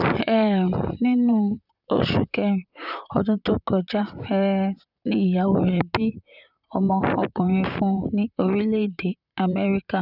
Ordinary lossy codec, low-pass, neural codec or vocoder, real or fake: none; 5.4 kHz; vocoder, 44.1 kHz, 128 mel bands, Pupu-Vocoder; fake